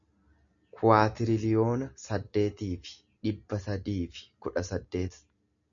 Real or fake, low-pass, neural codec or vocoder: real; 7.2 kHz; none